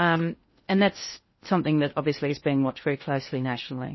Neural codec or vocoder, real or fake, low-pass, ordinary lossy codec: codec, 16 kHz in and 24 kHz out, 0.6 kbps, FocalCodec, streaming, 2048 codes; fake; 7.2 kHz; MP3, 24 kbps